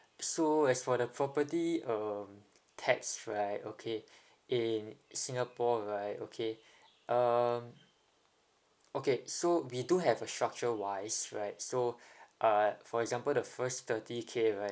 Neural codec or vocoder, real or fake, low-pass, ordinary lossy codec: none; real; none; none